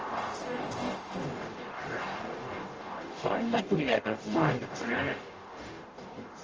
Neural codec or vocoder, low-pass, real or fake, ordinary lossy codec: codec, 44.1 kHz, 0.9 kbps, DAC; 7.2 kHz; fake; Opus, 24 kbps